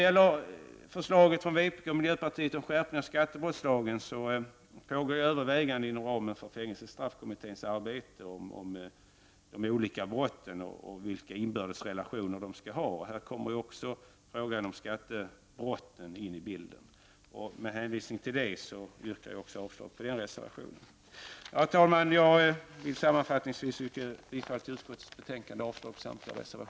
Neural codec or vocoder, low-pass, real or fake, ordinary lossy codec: none; none; real; none